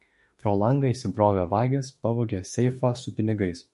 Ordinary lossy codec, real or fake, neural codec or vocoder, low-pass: MP3, 48 kbps; fake; autoencoder, 48 kHz, 32 numbers a frame, DAC-VAE, trained on Japanese speech; 14.4 kHz